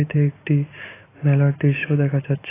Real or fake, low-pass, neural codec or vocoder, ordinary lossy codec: real; 3.6 kHz; none; AAC, 16 kbps